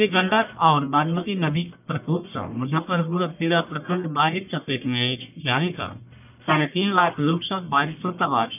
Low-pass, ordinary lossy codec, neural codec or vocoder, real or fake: 3.6 kHz; none; codec, 44.1 kHz, 1.7 kbps, Pupu-Codec; fake